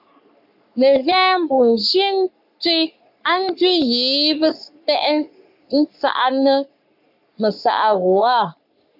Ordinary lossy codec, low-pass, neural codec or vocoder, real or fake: AAC, 48 kbps; 5.4 kHz; codec, 44.1 kHz, 3.4 kbps, Pupu-Codec; fake